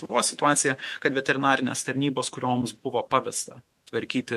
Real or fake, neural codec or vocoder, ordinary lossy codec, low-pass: fake; autoencoder, 48 kHz, 128 numbers a frame, DAC-VAE, trained on Japanese speech; MP3, 64 kbps; 14.4 kHz